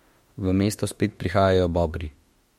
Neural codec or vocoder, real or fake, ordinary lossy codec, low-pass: autoencoder, 48 kHz, 32 numbers a frame, DAC-VAE, trained on Japanese speech; fake; MP3, 64 kbps; 19.8 kHz